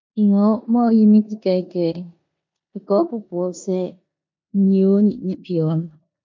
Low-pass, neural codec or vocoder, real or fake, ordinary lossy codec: 7.2 kHz; codec, 16 kHz in and 24 kHz out, 0.9 kbps, LongCat-Audio-Codec, four codebook decoder; fake; MP3, 48 kbps